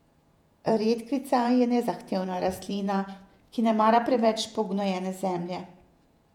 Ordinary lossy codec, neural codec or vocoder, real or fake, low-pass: none; vocoder, 48 kHz, 128 mel bands, Vocos; fake; 19.8 kHz